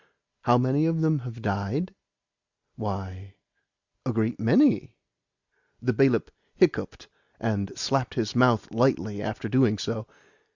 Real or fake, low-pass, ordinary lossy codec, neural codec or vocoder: real; 7.2 kHz; Opus, 64 kbps; none